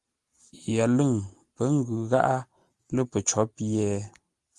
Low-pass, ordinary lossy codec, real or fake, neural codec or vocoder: 10.8 kHz; Opus, 24 kbps; real; none